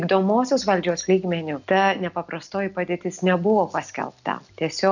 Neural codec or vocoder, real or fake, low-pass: none; real; 7.2 kHz